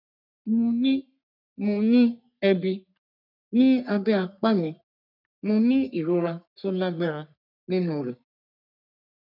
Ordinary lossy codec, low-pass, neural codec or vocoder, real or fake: none; 5.4 kHz; codec, 44.1 kHz, 3.4 kbps, Pupu-Codec; fake